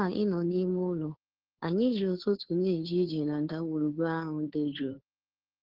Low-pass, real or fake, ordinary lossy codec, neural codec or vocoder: 5.4 kHz; fake; Opus, 16 kbps; codec, 16 kHz, 2 kbps, FunCodec, trained on Chinese and English, 25 frames a second